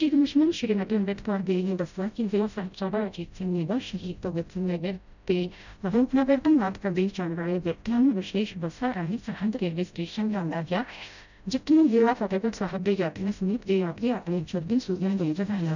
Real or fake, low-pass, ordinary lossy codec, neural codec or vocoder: fake; 7.2 kHz; none; codec, 16 kHz, 0.5 kbps, FreqCodec, smaller model